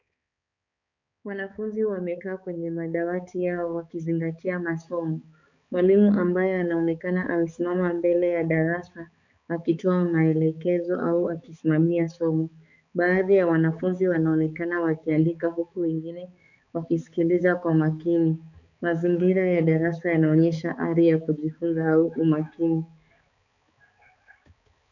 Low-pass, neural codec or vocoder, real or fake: 7.2 kHz; codec, 16 kHz, 4 kbps, X-Codec, HuBERT features, trained on balanced general audio; fake